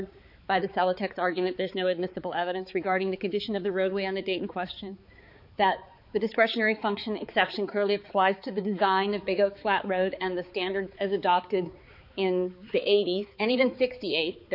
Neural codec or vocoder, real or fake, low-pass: codec, 16 kHz, 4 kbps, X-Codec, HuBERT features, trained on balanced general audio; fake; 5.4 kHz